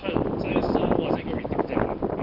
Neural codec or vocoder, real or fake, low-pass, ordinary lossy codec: none; real; 5.4 kHz; Opus, 16 kbps